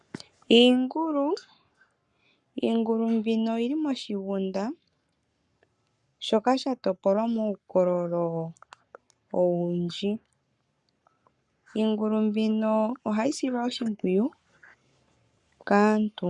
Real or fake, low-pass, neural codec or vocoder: fake; 10.8 kHz; codec, 44.1 kHz, 7.8 kbps, Pupu-Codec